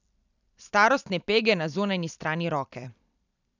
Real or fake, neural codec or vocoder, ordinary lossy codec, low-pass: fake; vocoder, 44.1 kHz, 128 mel bands every 256 samples, BigVGAN v2; none; 7.2 kHz